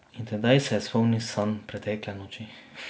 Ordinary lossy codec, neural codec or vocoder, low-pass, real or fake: none; none; none; real